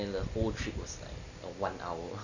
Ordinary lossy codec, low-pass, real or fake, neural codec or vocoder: none; 7.2 kHz; real; none